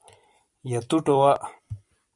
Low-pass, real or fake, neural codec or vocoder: 10.8 kHz; fake; vocoder, 44.1 kHz, 128 mel bands every 256 samples, BigVGAN v2